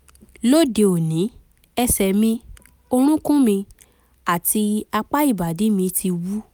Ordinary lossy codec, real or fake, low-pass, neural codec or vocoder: none; real; none; none